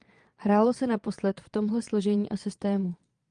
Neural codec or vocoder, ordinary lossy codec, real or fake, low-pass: vocoder, 22.05 kHz, 80 mel bands, Vocos; Opus, 32 kbps; fake; 9.9 kHz